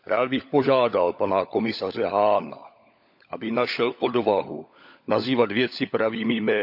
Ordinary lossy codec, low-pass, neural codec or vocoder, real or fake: none; 5.4 kHz; codec, 16 kHz, 16 kbps, FunCodec, trained on LibriTTS, 50 frames a second; fake